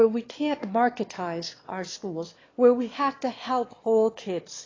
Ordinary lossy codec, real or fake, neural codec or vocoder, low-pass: AAC, 32 kbps; fake; autoencoder, 22.05 kHz, a latent of 192 numbers a frame, VITS, trained on one speaker; 7.2 kHz